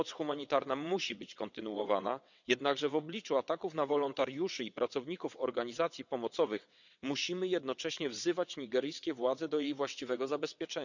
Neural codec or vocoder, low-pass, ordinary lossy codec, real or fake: vocoder, 22.05 kHz, 80 mel bands, WaveNeXt; 7.2 kHz; none; fake